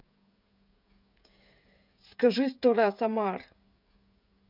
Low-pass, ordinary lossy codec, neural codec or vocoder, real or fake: 5.4 kHz; none; codec, 16 kHz, 16 kbps, FreqCodec, smaller model; fake